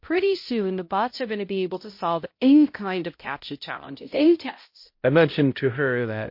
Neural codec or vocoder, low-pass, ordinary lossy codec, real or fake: codec, 16 kHz, 0.5 kbps, X-Codec, HuBERT features, trained on balanced general audio; 5.4 kHz; MP3, 32 kbps; fake